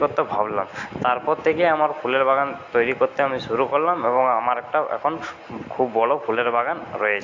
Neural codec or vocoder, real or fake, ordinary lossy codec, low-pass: none; real; AAC, 48 kbps; 7.2 kHz